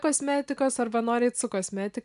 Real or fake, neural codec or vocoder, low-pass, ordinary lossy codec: real; none; 10.8 kHz; AAC, 96 kbps